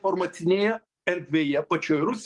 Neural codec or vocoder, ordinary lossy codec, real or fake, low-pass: codec, 44.1 kHz, 7.8 kbps, DAC; Opus, 24 kbps; fake; 10.8 kHz